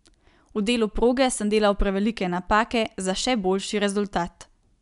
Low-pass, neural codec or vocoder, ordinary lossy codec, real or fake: 10.8 kHz; none; none; real